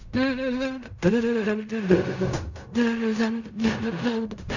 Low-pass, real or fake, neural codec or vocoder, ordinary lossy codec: 7.2 kHz; fake; codec, 16 kHz in and 24 kHz out, 0.4 kbps, LongCat-Audio-Codec, fine tuned four codebook decoder; none